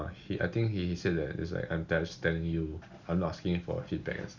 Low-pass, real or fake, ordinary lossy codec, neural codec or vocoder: 7.2 kHz; real; Opus, 64 kbps; none